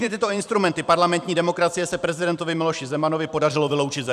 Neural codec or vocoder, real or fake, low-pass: vocoder, 44.1 kHz, 128 mel bands every 256 samples, BigVGAN v2; fake; 14.4 kHz